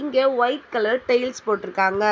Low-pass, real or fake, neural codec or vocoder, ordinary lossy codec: none; real; none; none